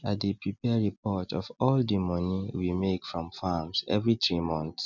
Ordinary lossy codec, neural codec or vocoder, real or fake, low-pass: none; none; real; 7.2 kHz